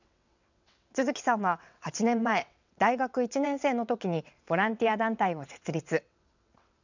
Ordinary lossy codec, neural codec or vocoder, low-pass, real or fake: none; vocoder, 22.05 kHz, 80 mel bands, WaveNeXt; 7.2 kHz; fake